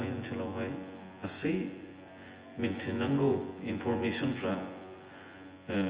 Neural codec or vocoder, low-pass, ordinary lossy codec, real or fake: vocoder, 24 kHz, 100 mel bands, Vocos; 3.6 kHz; Opus, 24 kbps; fake